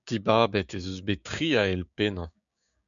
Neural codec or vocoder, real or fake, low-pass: codec, 16 kHz, 6 kbps, DAC; fake; 7.2 kHz